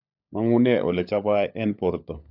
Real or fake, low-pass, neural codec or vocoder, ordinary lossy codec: fake; 5.4 kHz; codec, 16 kHz, 16 kbps, FunCodec, trained on LibriTTS, 50 frames a second; none